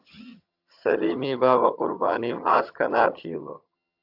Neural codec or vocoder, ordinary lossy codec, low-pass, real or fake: vocoder, 22.05 kHz, 80 mel bands, HiFi-GAN; AAC, 48 kbps; 5.4 kHz; fake